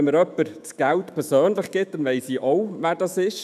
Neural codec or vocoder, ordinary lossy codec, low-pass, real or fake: autoencoder, 48 kHz, 128 numbers a frame, DAC-VAE, trained on Japanese speech; none; 14.4 kHz; fake